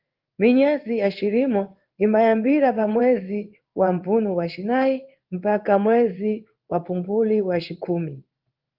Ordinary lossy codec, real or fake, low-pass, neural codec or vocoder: Opus, 32 kbps; fake; 5.4 kHz; codec, 16 kHz in and 24 kHz out, 1 kbps, XY-Tokenizer